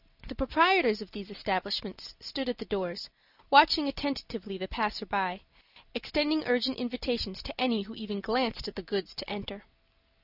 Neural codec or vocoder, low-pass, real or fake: none; 5.4 kHz; real